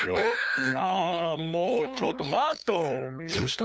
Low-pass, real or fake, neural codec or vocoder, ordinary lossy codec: none; fake; codec, 16 kHz, 2 kbps, FunCodec, trained on LibriTTS, 25 frames a second; none